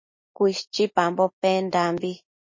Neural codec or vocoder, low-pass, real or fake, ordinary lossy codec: none; 7.2 kHz; real; MP3, 32 kbps